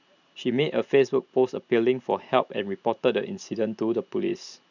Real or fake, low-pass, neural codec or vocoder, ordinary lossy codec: fake; 7.2 kHz; vocoder, 44.1 kHz, 128 mel bands every 512 samples, BigVGAN v2; none